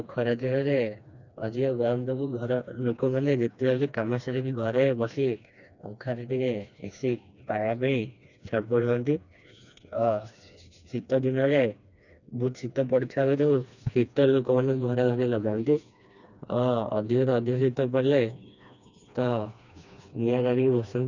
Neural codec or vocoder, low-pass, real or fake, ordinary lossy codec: codec, 16 kHz, 2 kbps, FreqCodec, smaller model; 7.2 kHz; fake; none